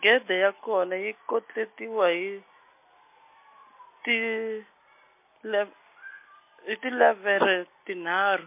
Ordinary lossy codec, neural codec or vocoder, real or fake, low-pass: MP3, 24 kbps; none; real; 3.6 kHz